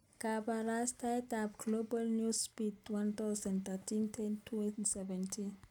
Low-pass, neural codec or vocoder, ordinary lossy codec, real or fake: 19.8 kHz; none; none; real